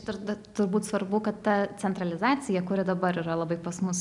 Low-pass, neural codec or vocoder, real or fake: 10.8 kHz; none; real